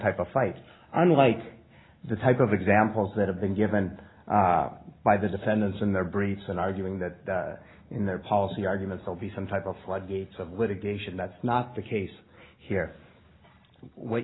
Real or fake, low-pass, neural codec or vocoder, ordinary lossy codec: real; 7.2 kHz; none; AAC, 16 kbps